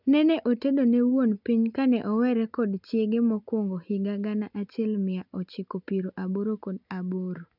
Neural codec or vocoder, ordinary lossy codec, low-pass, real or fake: none; none; 5.4 kHz; real